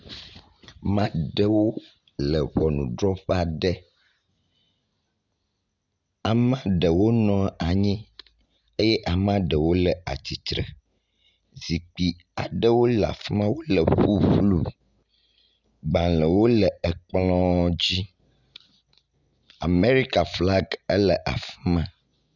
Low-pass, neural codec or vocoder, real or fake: 7.2 kHz; none; real